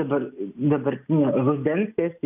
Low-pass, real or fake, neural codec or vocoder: 3.6 kHz; real; none